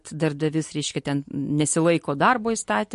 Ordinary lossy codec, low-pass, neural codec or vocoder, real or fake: MP3, 48 kbps; 14.4 kHz; none; real